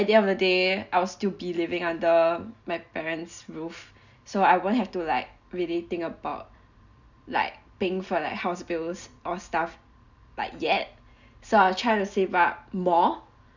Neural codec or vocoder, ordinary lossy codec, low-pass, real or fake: none; none; 7.2 kHz; real